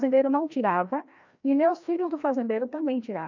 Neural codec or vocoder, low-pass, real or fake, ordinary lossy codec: codec, 16 kHz, 1 kbps, FreqCodec, larger model; 7.2 kHz; fake; none